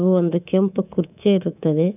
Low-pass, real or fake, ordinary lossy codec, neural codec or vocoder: 3.6 kHz; fake; none; codec, 24 kHz, 3.1 kbps, DualCodec